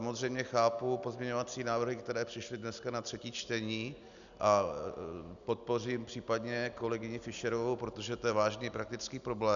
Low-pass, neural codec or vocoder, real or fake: 7.2 kHz; none; real